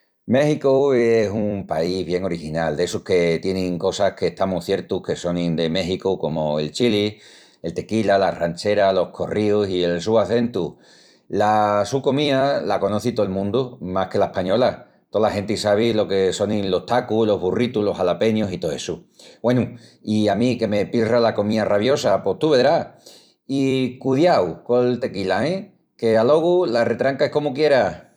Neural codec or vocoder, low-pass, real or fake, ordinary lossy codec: vocoder, 44.1 kHz, 128 mel bands every 256 samples, BigVGAN v2; 19.8 kHz; fake; none